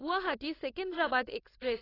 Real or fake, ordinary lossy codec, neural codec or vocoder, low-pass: fake; AAC, 24 kbps; codec, 16 kHz, 4.8 kbps, FACodec; 5.4 kHz